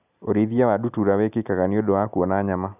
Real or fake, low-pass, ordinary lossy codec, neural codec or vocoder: real; 3.6 kHz; none; none